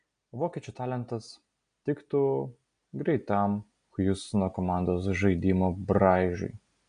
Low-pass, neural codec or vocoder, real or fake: 9.9 kHz; none; real